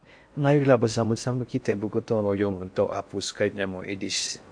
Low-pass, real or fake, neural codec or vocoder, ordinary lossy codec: 9.9 kHz; fake; codec, 16 kHz in and 24 kHz out, 0.6 kbps, FocalCodec, streaming, 4096 codes; MP3, 64 kbps